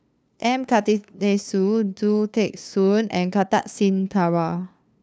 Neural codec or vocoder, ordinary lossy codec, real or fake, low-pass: codec, 16 kHz, 2 kbps, FunCodec, trained on LibriTTS, 25 frames a second; none; fake; none